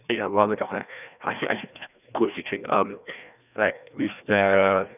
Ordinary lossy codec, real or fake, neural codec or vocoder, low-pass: none; fake; codec, 16 kHz, 1 kbps, FreqCodec, larger model; 3.6 kHz